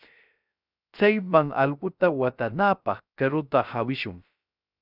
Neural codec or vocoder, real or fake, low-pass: codec, 16 kHz, 0.3 kbps, FocalCodec; fake; 5.4 kHz